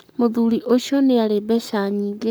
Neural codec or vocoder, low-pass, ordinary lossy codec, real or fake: codec, 44.1 kHz, 7.8 kbps, Pupu-Codec; none; none; fake